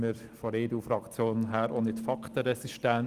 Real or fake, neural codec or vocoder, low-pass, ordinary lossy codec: real; none; 14.4 kHz; Opus, 24 kbps